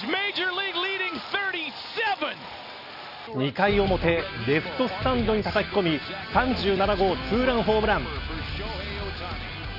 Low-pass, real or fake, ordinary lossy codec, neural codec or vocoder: 5.4 kHz; real; none; none